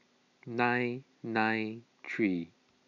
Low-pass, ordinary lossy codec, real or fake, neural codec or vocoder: 7.2 kHz; none; real; none